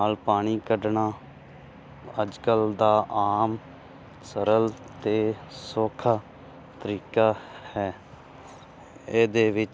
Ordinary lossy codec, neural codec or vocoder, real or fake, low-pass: none; none; real; none